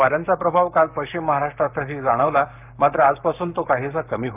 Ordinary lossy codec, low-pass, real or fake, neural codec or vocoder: none; 3.6 kHz; fake; codec, 44.1 kHz, 7.8 kbps, Pupu-Codec